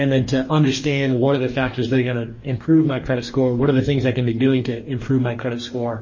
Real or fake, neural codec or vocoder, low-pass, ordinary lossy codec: fake; codec, 44.1 kHz, 2.6 kbps, DAC; 7.2 kHz; MP3, 32 kbps